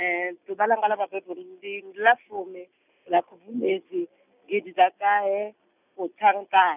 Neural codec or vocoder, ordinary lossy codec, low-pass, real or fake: none; none; 3.6 kHz; real